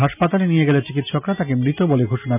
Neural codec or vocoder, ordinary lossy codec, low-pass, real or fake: none; none; 3.6 kHz; real